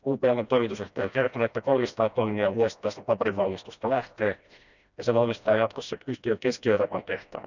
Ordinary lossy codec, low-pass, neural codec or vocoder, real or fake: none; 7.2 kHz; codec, 16 kHz, 1 kbps, FreqCodec, smaller model; fake